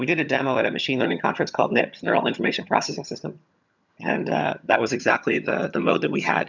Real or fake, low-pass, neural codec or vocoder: fake; 7.2 kHz; vocoder, 22.05 kHz, 80 mel bands, HiFi-GAN